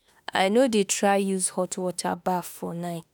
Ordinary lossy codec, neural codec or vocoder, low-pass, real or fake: none; autoencoder, 48 kHz, 32 numbers a frame, DAC-VAE, trained on Japanese speech; none; fake